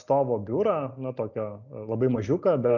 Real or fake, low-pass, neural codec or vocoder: real; 7.2 kHz; none